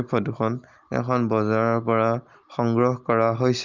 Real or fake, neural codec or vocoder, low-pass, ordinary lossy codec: real; none; 7.2 kHz; Opus, 32 kbps